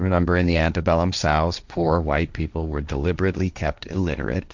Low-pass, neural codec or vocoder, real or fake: 7.2 kHz; codec, 16 kHz, 1.1 kbps, Voila-Tokenizer; fake